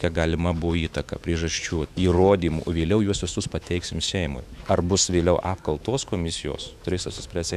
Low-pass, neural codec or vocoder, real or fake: 14.4 kHz; autoencoder, 48 kHz, 128 numbers a frame, DAC-VAE, trained on Japanese speech; fake